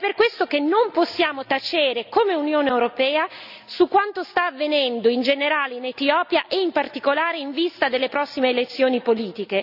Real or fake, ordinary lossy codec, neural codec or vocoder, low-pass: real; none; none; 5.4 kHz